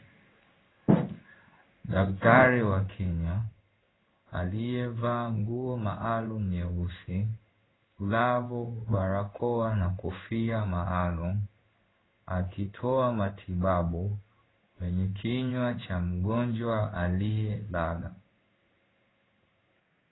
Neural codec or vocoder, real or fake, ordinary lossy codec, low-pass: codec, 16 kHz in and 24 kHz out, 1 kbps, XY-Tokenizer; fake; AAC, 16 kbps; 7.2 kHz